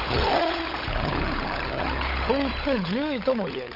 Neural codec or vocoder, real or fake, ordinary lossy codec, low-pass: codec, 16 kHz, 16 kbps, FunCodec, trained on LibriTTS, 50 frames a second; fake; none; 5.4 kHz